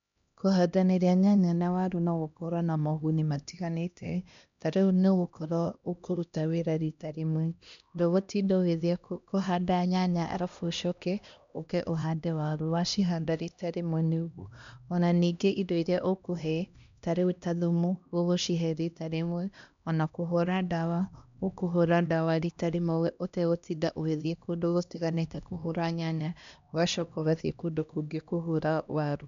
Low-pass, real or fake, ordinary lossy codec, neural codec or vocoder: 7.2 kHz; fake; MP3, 64 kbps; codec, 16 kHz, 1 kbps, X-Codec, HuBERT features, trained on LibriSpeech